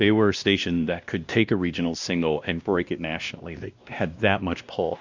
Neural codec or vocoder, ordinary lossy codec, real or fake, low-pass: codec, 16 kHz, 1 kbps, X-Codec, WavLM features, trained on Multilingual LibriSpeech; Opus, 64 kbps; fake; 7.2 kHz